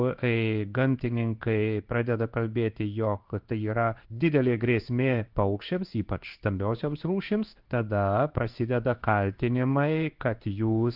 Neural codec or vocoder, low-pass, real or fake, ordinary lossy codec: codec, 16 kHz in and 24 kHz out, 1 kbps, XY-Tokenizer; 5.4 kHz; fake; Opus, 32 kbps